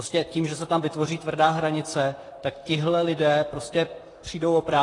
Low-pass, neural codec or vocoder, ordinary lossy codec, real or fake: 10.8 kHz; vocoder, 44.1 kHz, 128 mel bands, Pupu-Vocoder; AAC, 32 kbps; fake